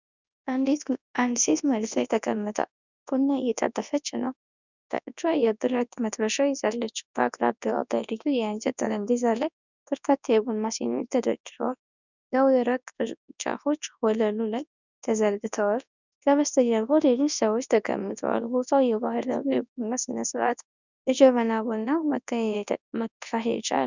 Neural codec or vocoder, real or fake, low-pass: codec, 24 kHz, 0.9 kbps, WavTokenizer, large speech release; fake; 7.2 kHz